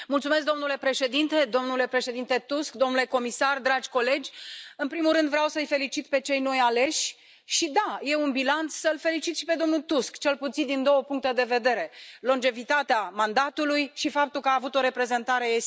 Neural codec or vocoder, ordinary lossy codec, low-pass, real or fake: none; none; none; real